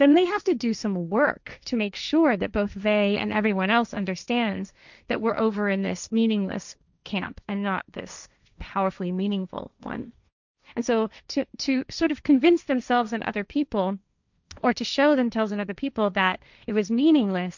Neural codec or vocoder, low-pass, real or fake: codec, 16 kHz, 1.1 kbps, Voila-Tokenizer; 7.2 kHz; fake